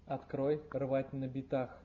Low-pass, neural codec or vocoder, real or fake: 7.2 kHz; none; real